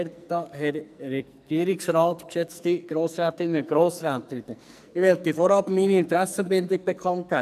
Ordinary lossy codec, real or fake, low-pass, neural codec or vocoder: none; fake; 14.4 kHz; codec, 44.1 kHz, 2.6 kbps, SNAC